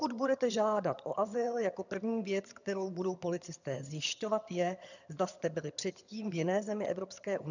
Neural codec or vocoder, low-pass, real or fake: vocoder, 22.05 kHz, 80 mel bands, HiFi-GAN; 7.2 kHz; fake